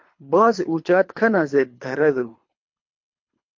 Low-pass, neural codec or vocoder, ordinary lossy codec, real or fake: 7.2 kHz; codec, 24 kHz, 3 kbps, HILCodec; MP3, 48 kbps; fake